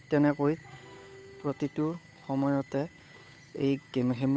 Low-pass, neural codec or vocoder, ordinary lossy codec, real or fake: none; codec, 16 kHz, 8 kbps, FunCodec, trained on Chinese and English, 25 frames a second; none; fake